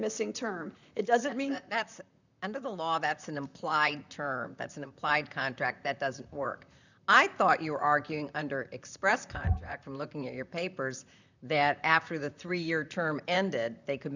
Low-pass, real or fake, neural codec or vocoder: 7.2 kHz; fake; vocoder, 44.1 kHz, 128 mel bands, Pupu-Vocoder